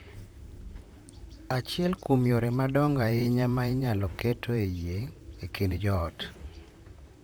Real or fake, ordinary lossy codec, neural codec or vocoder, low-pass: fake; none; vocoder, 44.1 kHz, 128 mel bands, Pupu-Vocoder; none